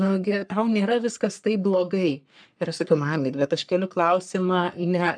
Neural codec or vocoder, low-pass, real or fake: codec, 44.1 kHz, 3.4 kbps, Pupu-Codec; 9.9 kHz; fake